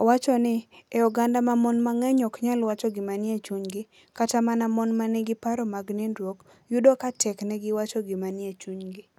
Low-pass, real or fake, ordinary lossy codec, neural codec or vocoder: 19.8 kHz; real; none; none